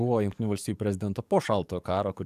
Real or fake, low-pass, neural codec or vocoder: fake; 14.4 kHz; codec, 44.1 kHz, 7.8 kbps, DAC